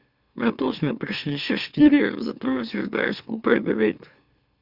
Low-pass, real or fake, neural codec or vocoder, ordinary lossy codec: 5.4 kHz; fake; autoencoder, 44.1 kHz, a latent of 192 numbers a frame, MeloTTS; Opus, 64 kbps